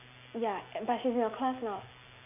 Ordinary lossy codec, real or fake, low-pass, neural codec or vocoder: none; real; 3.6 kHz; none